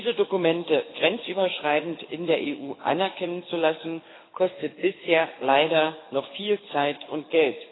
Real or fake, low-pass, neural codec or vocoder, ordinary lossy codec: fake; 7.2 kHz; codec, 24 kHz, 6 kbps, HILCodec; AAC, 16 kbps